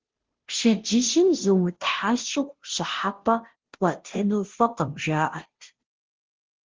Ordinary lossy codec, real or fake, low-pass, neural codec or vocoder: Opus, 16 kbps; fake; 7.2 kHz; codec, 16 kHz, 0.5 kbps, FunCodec, trained on Chinese and English, 25 frames a second